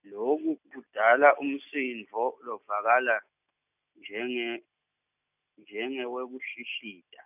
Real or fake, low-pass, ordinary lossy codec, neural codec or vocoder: real; 3.6 kHz; none; none